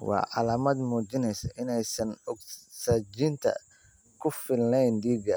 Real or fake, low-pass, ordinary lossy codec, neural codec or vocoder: real; none; none; none